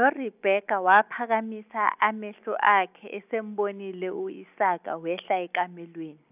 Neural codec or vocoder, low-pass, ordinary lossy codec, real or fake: none; 3.6 kHz; none; real